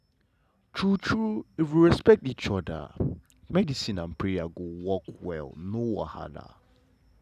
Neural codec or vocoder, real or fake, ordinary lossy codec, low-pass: none; real; none; 14.4 kHz